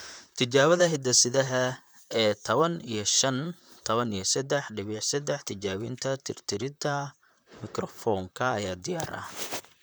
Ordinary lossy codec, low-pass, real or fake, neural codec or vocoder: none; none; fake; vocoder, 44.1 kHz, 128 mel bands, Pupu-Vocoder